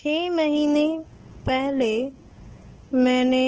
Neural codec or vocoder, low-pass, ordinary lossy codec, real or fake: codec, 16 kHz, 8 kbps, FunCodec, trained on Chinese and English, 25 frames a second; 7.2 kHz; Opus, 16 kbps; fake